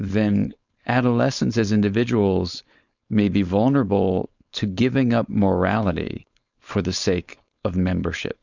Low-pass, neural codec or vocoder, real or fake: 7.2 kHz; codec, 16 kHz, 4.8 kbps, FACodec; fake